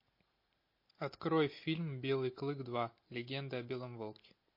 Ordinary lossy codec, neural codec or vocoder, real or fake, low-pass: MP3, 32 kbps; none; real; 5.4 kHz